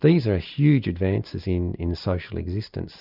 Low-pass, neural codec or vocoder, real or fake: 5.4 kHz; none; real